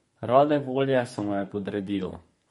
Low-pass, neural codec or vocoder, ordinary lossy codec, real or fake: 14.4 kHz; codec, 32 kHz, 1.9 kbps, SNAC; MP3, 48 kbps; fake